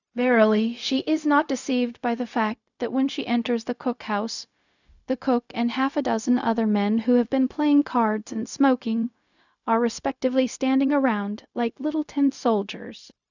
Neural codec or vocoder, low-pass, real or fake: codec, 16 kHz, 0.4 kbps, LongCat-Audio-Codec; 7.2 kHz; fake